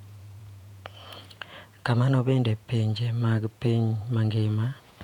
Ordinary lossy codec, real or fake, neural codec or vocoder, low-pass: none; fake; vocoder, 48 kHz, 128 mel bands, Vocos; 19.8 kHz